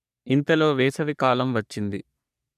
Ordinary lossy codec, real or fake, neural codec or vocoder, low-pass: none; fake; codec, 44.1 kHz, 3.4 kbps, Pupu-Codec; 14.4 kHz